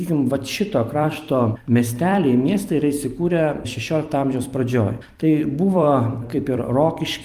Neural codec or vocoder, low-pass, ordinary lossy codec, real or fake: vocoder, 44.1 kHz, 128 mel bands every 256 samples, BigVGAN v2; 14.4 kHz; Opus, 32 kbps; fake